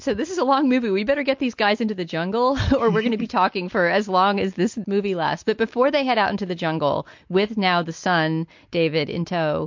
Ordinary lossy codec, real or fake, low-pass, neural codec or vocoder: MP3, 48 kbps; real; 7.2 kHz; none